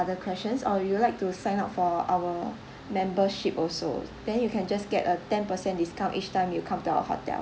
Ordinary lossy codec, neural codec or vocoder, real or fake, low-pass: none; none; real; none